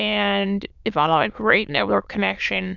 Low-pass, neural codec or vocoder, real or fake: 7.2 kHz; autoencoder, 22.05 kHz, a latent of 192 numbers a frame, VITS, trained on many speakers; fake